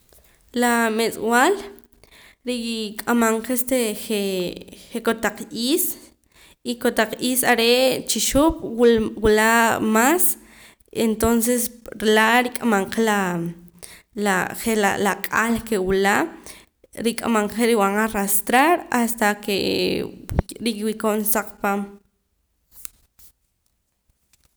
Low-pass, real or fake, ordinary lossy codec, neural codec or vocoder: none; real; none; none